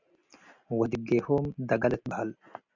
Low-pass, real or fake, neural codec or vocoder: 7.2 kHz; real; none